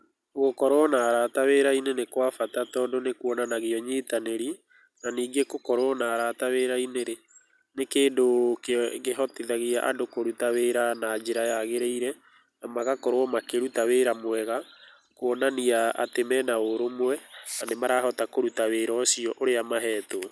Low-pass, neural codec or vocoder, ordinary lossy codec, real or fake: none; none; none; real